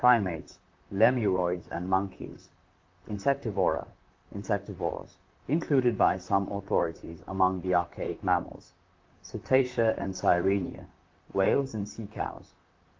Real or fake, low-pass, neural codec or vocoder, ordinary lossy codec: fake; 7.2 kHz; vocoder, 44.1 kHz, 128 mel bands, Pupu-Vocoder; Opus, 24 kbps